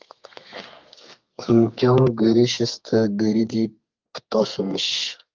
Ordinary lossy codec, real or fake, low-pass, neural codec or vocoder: Opus, 24 kbps; fake; 7.2 kHz; codec, 32 kHz, 1.9 kbps, SNAC